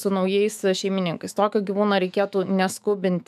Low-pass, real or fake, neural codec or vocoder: 14.4 kHz; fake; autoencoder, 48 kHz, 128 numbers a frame, DAC-VAE, trained on Japanese speech